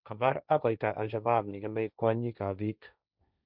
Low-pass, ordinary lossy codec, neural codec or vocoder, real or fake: 5.4 kHz; none; codec, 16 kHz, 1.1 kbps, Voila-Tokenizer; fake